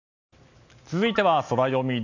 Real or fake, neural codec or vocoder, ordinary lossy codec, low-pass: real; none; none; 7.2 kHz